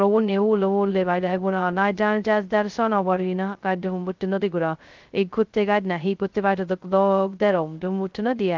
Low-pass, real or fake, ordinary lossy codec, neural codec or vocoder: 7.2 kHz; fake; Opus, 32 kbps; codec, 16 kHz, 0.2 kbps, FocalCodec